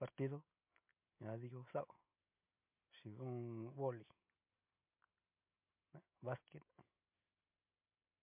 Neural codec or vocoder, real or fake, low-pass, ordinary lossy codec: none; real; 3.6 kHz; MP3, 32 kbps